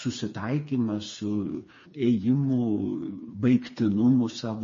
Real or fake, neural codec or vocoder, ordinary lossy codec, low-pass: fake; codec, 16 kHz, 4 kbps, FreqCodec, smaller model; MP3, 32 kbps; 7.2 kHz